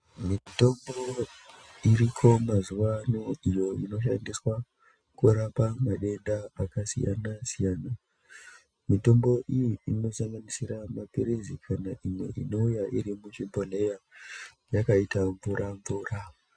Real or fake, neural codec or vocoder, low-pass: real; none; 9.9 kHz